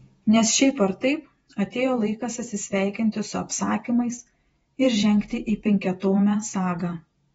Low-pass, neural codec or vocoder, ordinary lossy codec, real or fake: 19.8 kHz; vocoder, 48 kHz, 128 mel bands, Vocos; AAC, 24 kbps; fake